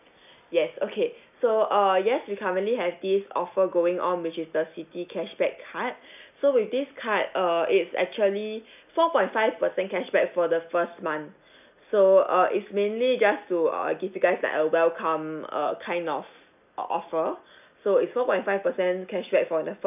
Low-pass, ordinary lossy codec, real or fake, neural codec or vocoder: 3.6 kHz; none; real; none